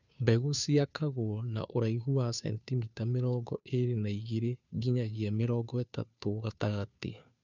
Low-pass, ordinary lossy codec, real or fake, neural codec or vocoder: 7.2 kHz; none; fake; codec, 16 kHz, 2 kbps, FunCodec, trained on Chinese and English, 25 frames a second